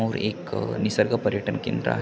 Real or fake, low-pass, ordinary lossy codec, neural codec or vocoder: real; none; none; none